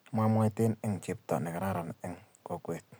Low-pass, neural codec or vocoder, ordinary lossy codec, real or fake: none; none; none; real